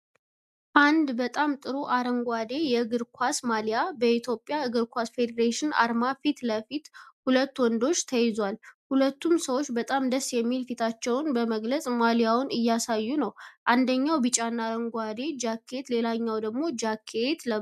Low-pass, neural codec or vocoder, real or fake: 14.4 kHz; none; real